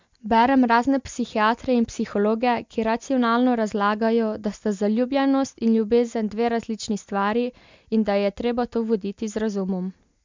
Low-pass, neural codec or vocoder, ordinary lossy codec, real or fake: 7.2 kHz; none; MP3, 64 kbps; real